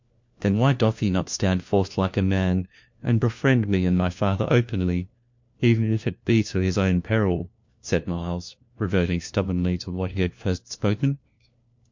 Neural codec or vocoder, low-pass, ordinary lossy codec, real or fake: codec, 16 kHz, 1 kbps, FunCodec, trained on LibriTTS, 50 frames a second; 7.2 kHz; MP3, 48 kbps; fake